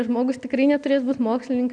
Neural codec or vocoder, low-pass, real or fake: none; 9.9 kHz; real